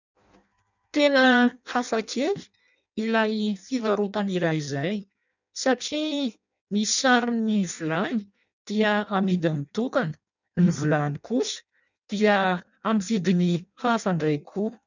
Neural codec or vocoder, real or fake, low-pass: codec, 16 kHz in and 24 kHz out, 0.6 kbps, FireRedTTS-2 codec; fake; 7.2 kHz